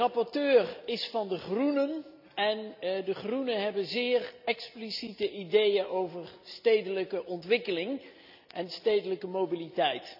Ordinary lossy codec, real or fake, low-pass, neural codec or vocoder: none; real; 5.4 kHz; none